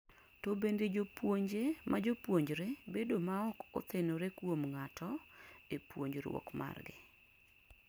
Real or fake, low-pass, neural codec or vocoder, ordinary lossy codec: real; none; none; none